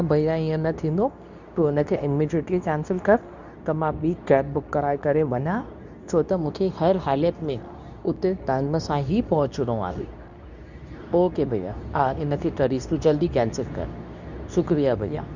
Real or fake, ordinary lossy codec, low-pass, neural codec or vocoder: fake; none; 7.2 kHz; codec, 24 kHz, 0.9 kbps, WavTokenizer, medium speech release version 2